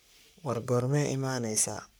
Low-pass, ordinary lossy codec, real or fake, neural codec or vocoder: none; none; fake; codec, 44.1 kHz, 3.4 kbps, Pupu-Codec